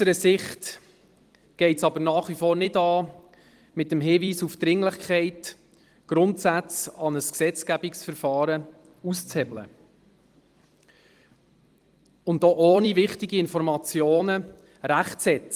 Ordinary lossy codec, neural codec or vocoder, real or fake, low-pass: Opus, 24 kbps; vocoder, 44.1 kHz, 128 mel bands every 256 samples, BigVGAN v2; fake; 14.4 kHz